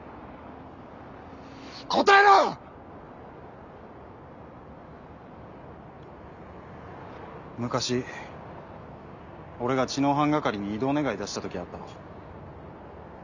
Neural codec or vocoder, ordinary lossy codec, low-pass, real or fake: none; none; 7.2 kHz; real